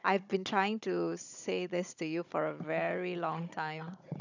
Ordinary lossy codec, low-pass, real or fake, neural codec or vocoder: none; 7.2 kHz; fake; codec, 16 kHz, 16 kbps, FunCodec, trained on Chinese and English, 50 frames a second